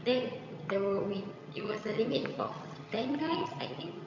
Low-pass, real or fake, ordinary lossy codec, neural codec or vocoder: 7.2 kHz; fake; MP3, 48 kbps; vocoder, 22.05 kHz, 80 mel bands, HiFi-GAN